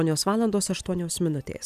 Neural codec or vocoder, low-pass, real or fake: none; 14.4 kHz; real